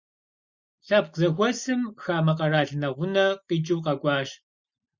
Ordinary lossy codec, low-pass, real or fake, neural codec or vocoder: Opus, 64 kbps; 7.2 kHz; real; none